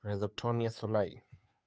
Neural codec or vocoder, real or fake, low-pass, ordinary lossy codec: codec, 16 kHz, 2 kbps, FunCodec, trained on Chinese and English, 25 frames a second; fake; none; none